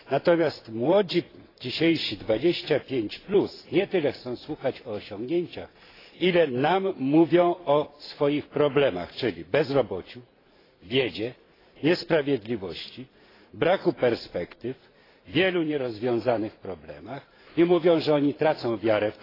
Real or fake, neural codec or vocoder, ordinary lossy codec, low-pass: fake; vocoder, 44.1 kHz, 128 mel bands every 512 samples, BigVGAN v2; AAC, 24 kbps; 5.4 kHz